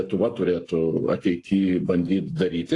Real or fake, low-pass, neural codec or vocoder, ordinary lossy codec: fake; 10.8 kHz; codec, 44.1 kHz, 7.8 kbps, Pupu-Codec; AAC, 48 kbps